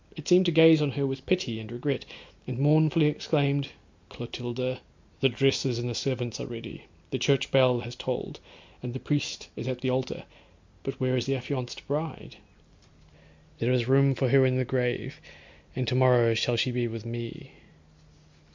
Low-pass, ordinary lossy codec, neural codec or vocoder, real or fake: 7.2 kHz; MP3, 64 kbps; none; real